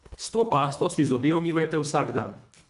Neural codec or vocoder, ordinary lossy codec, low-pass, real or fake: codec, 24 kHz, 1.5 kbps, HILCodec; MP3, 96 kbps; 10.8 kHz; fake